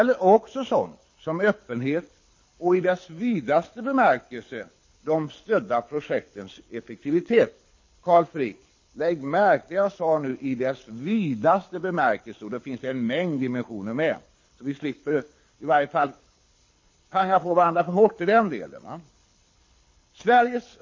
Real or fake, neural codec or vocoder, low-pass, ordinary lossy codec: fake; codec, 24 kHz, 6 kbps, HILCodec; 7.2 kHz; MP3, 32 kbps